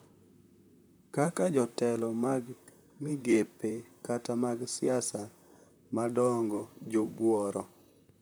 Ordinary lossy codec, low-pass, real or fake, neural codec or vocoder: none; none; fake; vocoder, 44.1 kHz, 128 mel bands, Pupu-Vocoder